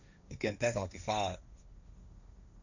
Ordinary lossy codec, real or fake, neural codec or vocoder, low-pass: none; fake; codec, 16 kHz, 1.1 kbps, Voila-Tokenizer; 7.2 kHz